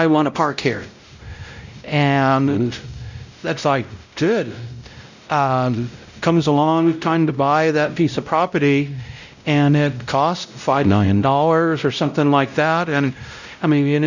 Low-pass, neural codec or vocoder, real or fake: 7.2 kHz; codec, 16 kHz, 0.5 kbps, X-Codec, WavLM features, trained on Multilingual LibriSpeech; fake